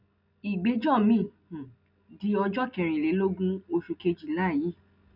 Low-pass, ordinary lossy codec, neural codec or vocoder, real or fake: 5.4 kHz; none; none; real